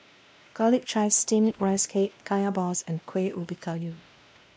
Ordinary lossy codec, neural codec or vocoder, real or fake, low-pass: none; codec, 16 kHz, 1 kbps, X-Codec, WavLM features, trained on Multilingual LibriSpeech; fake; none